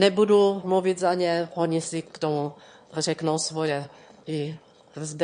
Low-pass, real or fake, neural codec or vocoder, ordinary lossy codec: 9.9 kHz; fake; autoencoder, 22.05 kHz, a latent of 192 numbers a frame, VITS, trained on one speaker; MP3, 48 kbps